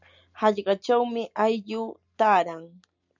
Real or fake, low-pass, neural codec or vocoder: real; 7.2 kHz; none